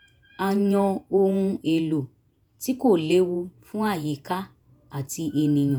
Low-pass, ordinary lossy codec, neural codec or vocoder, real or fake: none; none; vocoder, 48 kHz, 128 mel bands, Vocos; fake